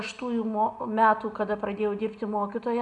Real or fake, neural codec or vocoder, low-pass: real; none; 10.8 kHz